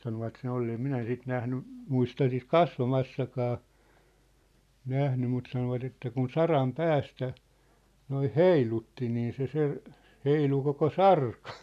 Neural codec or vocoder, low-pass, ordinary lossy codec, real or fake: none; 14.4 kHz; none; real